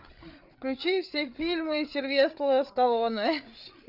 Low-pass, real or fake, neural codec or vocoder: 5.4 kHz; fake; codec, 16 kHz, 8 kbps, FreqCodec, larger model